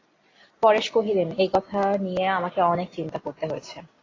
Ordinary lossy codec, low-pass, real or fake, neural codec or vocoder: AAC, 32 kbps; 7.2 kHz; real; none